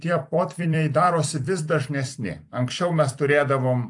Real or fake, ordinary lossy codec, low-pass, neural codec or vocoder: fake; AAC, 64 kbps; 10.8 kHz; vocoder, 44.1 kHz, 128 mel bands every 256 samples, BigVGAN v2